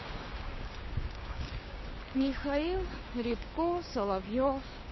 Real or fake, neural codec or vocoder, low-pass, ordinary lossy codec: fake; codec, 16 kHz, 6 kbps, DAC; 7.2 kHz; MP3, 24 kbps